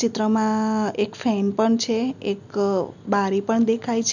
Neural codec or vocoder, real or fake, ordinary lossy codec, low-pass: none; real; none; 7.2 kHz